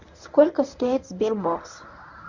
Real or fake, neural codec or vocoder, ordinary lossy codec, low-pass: fake; codec, 24 kHz, 0.9 kbps, WavTokenizer, medium speech release version 1; none; 7.2 kHz